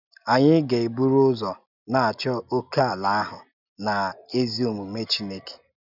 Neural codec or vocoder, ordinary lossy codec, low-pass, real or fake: none; none; 5.4 kHz; real